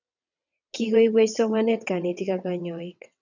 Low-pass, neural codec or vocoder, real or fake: 7.2 kHz; vocoder, 22.05 kHz, 80 mel bands, WaveNeXt; fake